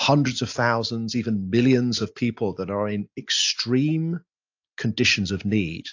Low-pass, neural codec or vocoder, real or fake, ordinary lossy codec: 7.2 kHz; none; real; AAC, 48 kbps